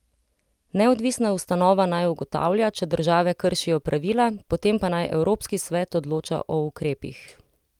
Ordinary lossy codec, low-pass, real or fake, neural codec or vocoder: Opus, 32 kbps; 19.8 kHz; real; none